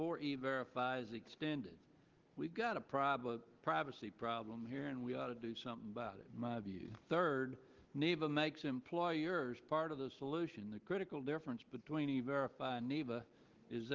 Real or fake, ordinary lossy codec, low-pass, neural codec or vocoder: real; Opus, 16 kbps; 7.2 kHz; none